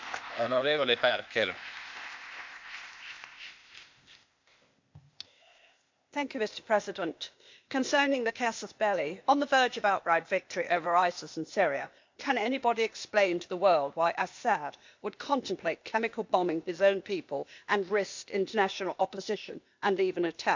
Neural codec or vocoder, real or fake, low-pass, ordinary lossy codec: codec, 16 kHz, 0.8 kbps, ZipCodec; fake; 7.2 kHz; MP3, 64 kbps